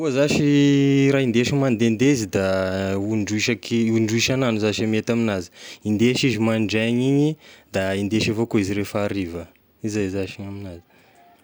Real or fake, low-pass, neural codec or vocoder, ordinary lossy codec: real; none; none; none